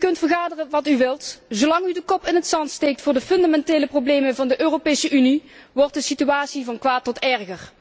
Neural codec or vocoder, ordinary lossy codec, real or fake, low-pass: none; none; real; none